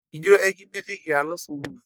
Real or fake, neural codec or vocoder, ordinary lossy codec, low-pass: fake; codec, 44.1 kHz, 1.7 kbps, Pupu-Codec; none; none